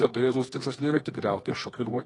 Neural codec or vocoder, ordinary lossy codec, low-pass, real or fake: codec, 24 kHz, 0.9 kbps, WavTokenizer, medium music audio release; AAC, 32 kbps; 10.8 kHz; fake